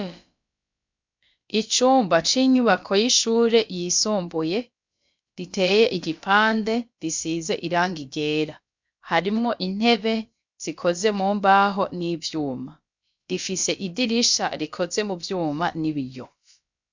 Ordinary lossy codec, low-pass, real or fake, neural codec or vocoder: MP3, 64 kbps; 7.2 kHz; fake; codec, 16 kHz, about 1 kbps, DyCAST, with the encoder's durations